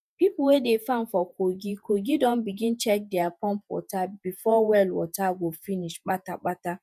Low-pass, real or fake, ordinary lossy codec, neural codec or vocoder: 14.4 kHz; fake; none; vocoder, 48 kHz, 128 mel bands, Vocos